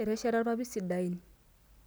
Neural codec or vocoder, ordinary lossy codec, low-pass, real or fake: none; none; none; real